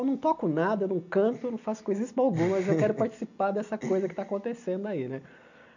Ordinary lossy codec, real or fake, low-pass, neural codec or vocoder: none; real; 7.2 kHz; none